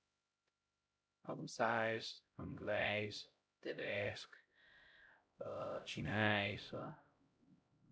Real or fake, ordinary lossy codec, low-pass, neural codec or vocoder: fake; none; none; codec, 16 kHz, 0.5 kbps, X-Codec, HuBERT features, trained on LibriSpeech